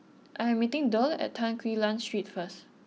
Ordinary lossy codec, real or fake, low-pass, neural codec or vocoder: none; real; none; none